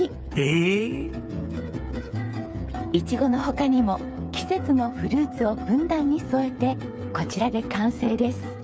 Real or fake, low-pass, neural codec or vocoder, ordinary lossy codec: fake; none; codec, 16 kHz, 8 kbps, FreqCodec, smaller model; none